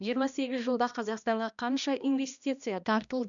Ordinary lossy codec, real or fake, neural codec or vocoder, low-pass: none; fake; codec, 16 kHz, 1 kbps, X-Codec, HuBERT features, trained on balanced general audio; 7.2 kHz